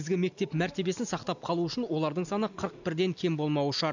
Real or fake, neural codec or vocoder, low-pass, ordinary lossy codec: real; none; 7.2 kHz; none